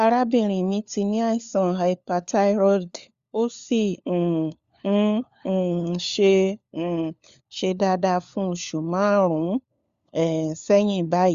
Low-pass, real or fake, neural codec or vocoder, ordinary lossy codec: 7.2 kHz; fake; codec, 16 kHz, 4 kbps, FunCodec, trained on LibriTTS, 50 frames a second; Opus, 64 kbps